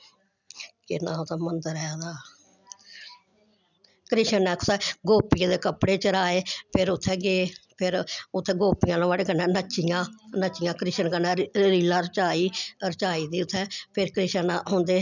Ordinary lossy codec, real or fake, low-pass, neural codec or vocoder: none; real; 7.2 kHz; none